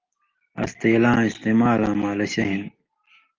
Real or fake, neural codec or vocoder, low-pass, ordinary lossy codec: real; none; 7.2 kHz; Opus, 32 kbps